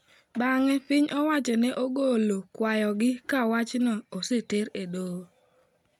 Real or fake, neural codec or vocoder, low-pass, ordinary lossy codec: real; none; 19.8 kHz; none